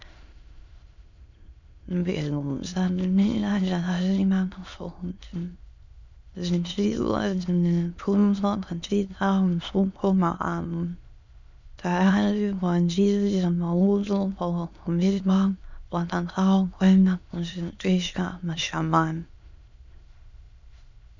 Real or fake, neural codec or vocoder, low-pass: fake; autoencoder, 22.05 kHz, a latent of 192 numbers a frame, VITS, trained on many speakers; 7.2 kHz